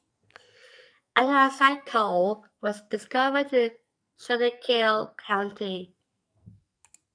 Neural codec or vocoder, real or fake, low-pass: codec, 44.1 kHz, 2.6 kbps, SNAC; fake; 9.9 kHz